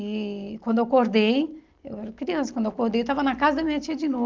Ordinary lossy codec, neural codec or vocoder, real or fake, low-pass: Opus, 24 kbps; none; real; 7.2 kHz